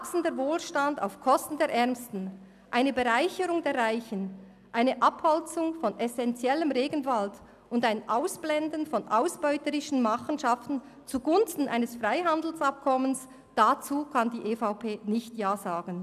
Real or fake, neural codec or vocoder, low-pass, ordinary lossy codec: real; none; 14.4 kHz; none